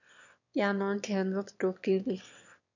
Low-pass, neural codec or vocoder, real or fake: 7.2 kHz; autoencoder, 22.05 kHz, a latent of 192 numbers a frame, VITS, trained on one speaker; fake